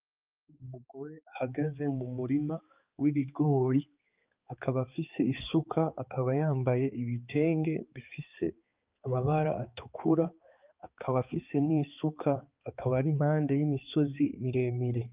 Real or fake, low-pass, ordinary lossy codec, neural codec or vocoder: fake; 3.6 kHz; Opus, 24 kbps; codec, 16 kHz, 4 kbps, X-Codec, HuBERT features, trained on balanced general audio